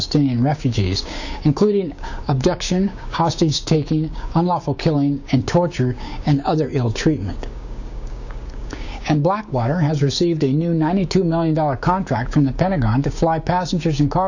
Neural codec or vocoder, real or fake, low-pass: codec, 16 kHz, 6 kbps, DAC; fake; 7.2 kHz